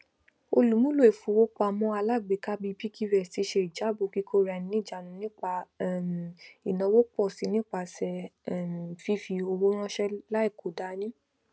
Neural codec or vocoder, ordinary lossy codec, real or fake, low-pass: none; none; real; none